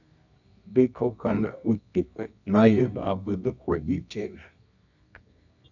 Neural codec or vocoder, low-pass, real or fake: codec, 24 kHz, 0.9 kbps, WavTokenizer, medium music audio release; 7.2 kHz; fake